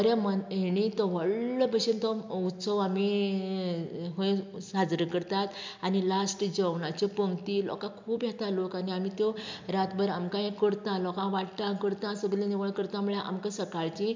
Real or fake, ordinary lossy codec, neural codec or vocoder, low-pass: real; MP3, 64 kbps; none; 7.2 kHz